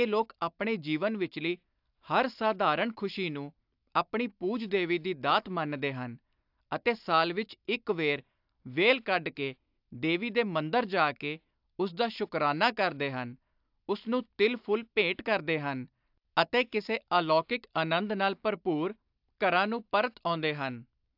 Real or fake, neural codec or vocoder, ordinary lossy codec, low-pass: real; none; AAC, 48 kbps; 5.4 kHz